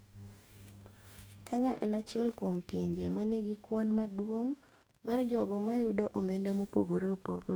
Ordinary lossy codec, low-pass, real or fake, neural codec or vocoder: none; none; fake; codec, 44.1 kHz, 2.6 kbps, DAC